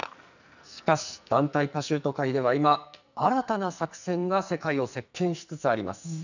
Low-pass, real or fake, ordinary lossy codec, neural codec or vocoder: 7.2 kHz; fake; none; codec, 44.1 kHz, 2.6 kbps, SNAC